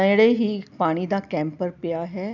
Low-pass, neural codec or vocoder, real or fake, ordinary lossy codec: 7.2 kHz; none; real; none